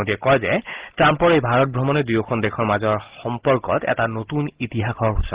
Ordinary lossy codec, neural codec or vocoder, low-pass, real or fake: Opus, 16 kbps; none; 3.6 kHz; real